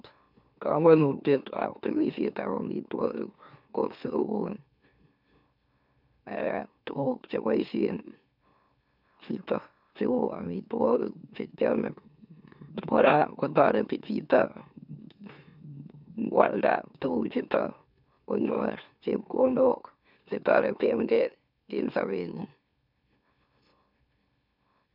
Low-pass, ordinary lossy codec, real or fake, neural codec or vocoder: 5.4 kHz; none; fake; autoencoder, 44.1 kHz, a latent of 192 numbers a frame, MeloTTS